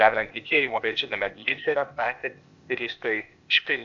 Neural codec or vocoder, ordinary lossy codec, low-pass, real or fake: codec, 16 kHz, 0.8 kbps, ZipCodec; AAC, 64 kbps; 7.2 kHz; fake